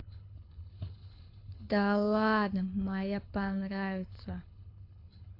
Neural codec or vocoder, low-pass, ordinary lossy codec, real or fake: codec, 24 kHz, 6 kbps, HILCodec; 5.4 kHz; AAC, 32 kbps; fake